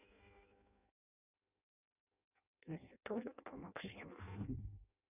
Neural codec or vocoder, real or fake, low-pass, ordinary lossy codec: codec, 16 kHz in and 24 kHz out, 0.6 kbps, FireRedTTS-2 codec; fake; 3.6 kHz; none